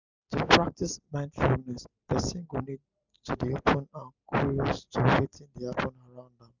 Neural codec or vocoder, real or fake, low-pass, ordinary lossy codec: none; real; 7.2 kHz; Opus, 64 kbps